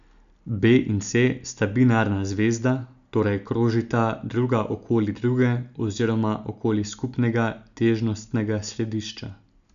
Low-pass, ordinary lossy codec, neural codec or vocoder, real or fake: 7.2 kHz; none; none; real